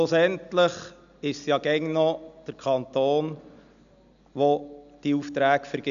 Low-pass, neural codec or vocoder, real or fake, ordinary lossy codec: 7.2 kHz; none; real; none